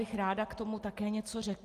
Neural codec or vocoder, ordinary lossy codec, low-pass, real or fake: none; Opus, 32 kbps; 14.4 kHz; real